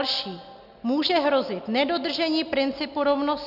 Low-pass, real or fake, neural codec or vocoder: 5.4 kHz; real; none